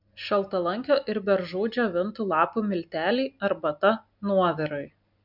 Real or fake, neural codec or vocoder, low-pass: real; none; 5.4 kHz